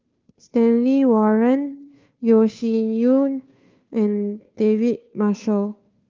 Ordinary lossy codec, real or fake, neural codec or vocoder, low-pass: Opus, 24 kbps; fake; codec, 16 kHz, 2 kbps, FunCodec, trained on Chinese and English, 25 frames a second; 7.2 kHz